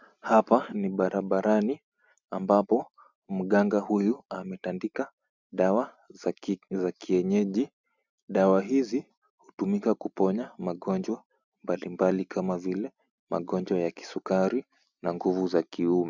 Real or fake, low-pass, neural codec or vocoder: real; 7.2 kHz; none